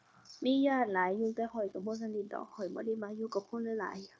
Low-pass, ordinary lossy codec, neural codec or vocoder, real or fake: none; none; codec, 16 kHz, 0.9 kbps, LongCat-Audio-Codec; fake